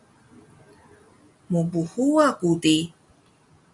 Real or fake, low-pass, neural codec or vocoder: real; 10.8 kHz; none